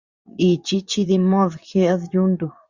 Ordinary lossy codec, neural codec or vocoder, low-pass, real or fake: Opus, 64 kbps; vocoder, 24 kHz, 100 mel bands, Vocos; 7.2 kHz; fake